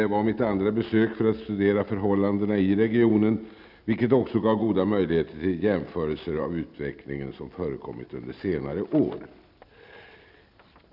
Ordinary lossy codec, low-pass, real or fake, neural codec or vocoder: none; 5.4 kHz; real; none